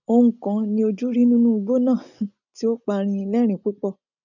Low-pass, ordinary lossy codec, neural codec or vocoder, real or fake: 7.2 kHz; none; none; real